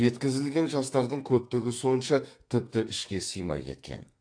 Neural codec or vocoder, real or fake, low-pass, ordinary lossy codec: codec, 32 kHz, 1.9 kbps, SNAC; fake; 9.9 kHz; AAC, 48 kbps